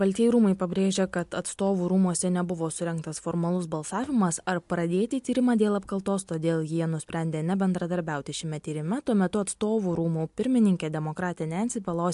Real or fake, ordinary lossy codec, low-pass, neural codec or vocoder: real; MP3, 64 kbps; 10.8 kHz; none